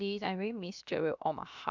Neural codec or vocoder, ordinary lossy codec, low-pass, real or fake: codec, 16 kHz, 0.7 kbps, FocalCodec; none; 7.2 kHz; fake